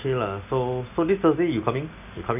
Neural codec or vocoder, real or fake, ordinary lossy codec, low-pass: none; real; none; 3.6 kHz